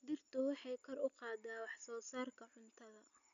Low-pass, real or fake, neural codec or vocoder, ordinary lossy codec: 7.2 kHz; real; none; none